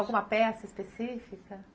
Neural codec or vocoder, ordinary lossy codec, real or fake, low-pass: none; none; real; none